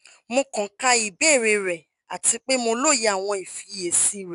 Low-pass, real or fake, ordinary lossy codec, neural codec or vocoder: 10.8 kHz; real; none; none